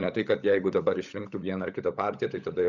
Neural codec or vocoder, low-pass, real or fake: codec, 16 kHz, 16 kbps, FunCodec, trained on LibriTTS, 50 frames a second; 7.2 kHz; fake